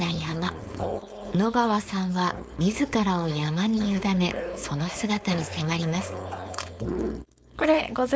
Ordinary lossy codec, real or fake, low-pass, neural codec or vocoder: none; fake; none; codec, 16 kHz, 4.8 kbps, FACodec